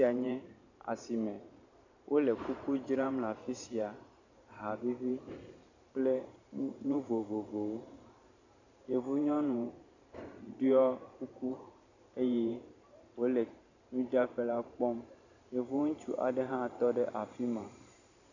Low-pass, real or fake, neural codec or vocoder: 7.2 kHz; fake; vocoder, 44.1 kHz, 128 mel bands every 512 samples, BigVGAN v2